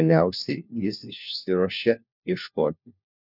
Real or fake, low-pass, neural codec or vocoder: fake; 5.4 kHz; codec, 16 kHz, 1 kbps, FunCodec, trained on LibriTTS, 50 frames a second